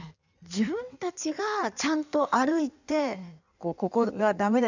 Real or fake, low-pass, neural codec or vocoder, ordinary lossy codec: fake; 7.2 kHz; codec, 16 kHz in and 24 kHz out, 1.1 kbps, FireRedTTS-2 codec; none